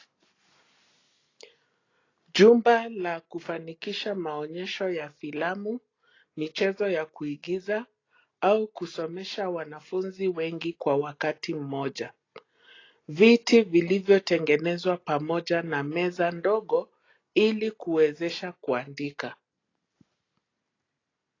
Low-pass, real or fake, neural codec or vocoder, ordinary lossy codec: 7.2 kHz; real; none; AAC, 32 kbps